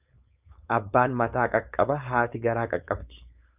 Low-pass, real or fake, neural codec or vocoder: 3.6 kHz; fake; codec, 16 kHz, 4.8 kbps, FACodec